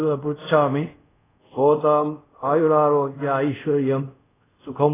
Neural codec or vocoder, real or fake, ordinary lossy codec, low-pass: codec, 24 kHz, 0.5 kbps, DualCodec; fake; AAC, 16 kbps; 3.6 kHz